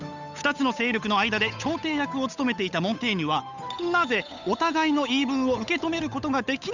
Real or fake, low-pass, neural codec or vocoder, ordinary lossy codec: fake; 7.2 kHz; codec, 16 kHz, 8 kbps, FunCodec, trained on Chinese and English, 25 frames a second; none